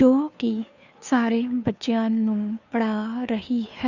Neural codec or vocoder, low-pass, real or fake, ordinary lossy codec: codec, 16 kHz, 2 kbps, FunCodec, trained on Chinese and English, 25 frames a second; 7.2 kHz; fake; none